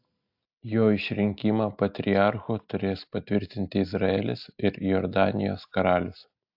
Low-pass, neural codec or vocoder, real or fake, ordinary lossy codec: 5.4 kHz; none; real; AAC, 48 kbps